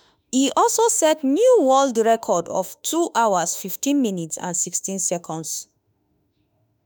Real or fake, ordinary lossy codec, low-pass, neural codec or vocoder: fake; none; none; autoencoder, 48 kHz, 32 numbers a frame, DAC-VAE, trained on Japanese speech